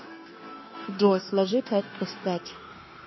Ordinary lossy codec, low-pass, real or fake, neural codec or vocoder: MP3, 24 kbps; 7.2 kHz; fake; codec, 16 kHz, 0.9 kbps, LongCat-Audio-Codec